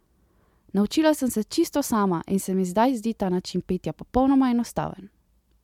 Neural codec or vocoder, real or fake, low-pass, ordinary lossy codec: none; real; 19.8 kHz; MP3, 96 kbps